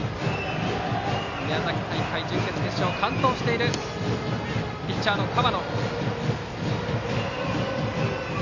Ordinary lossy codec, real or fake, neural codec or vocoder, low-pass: none; real; none; 7.2 kHz